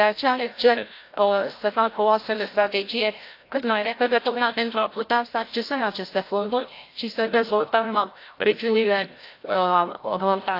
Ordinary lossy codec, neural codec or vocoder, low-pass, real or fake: AAC, 32 kbps; codec, 16 kHz, 0.5 kbps, FreqCodec, larger model; 5.4 kHz; fake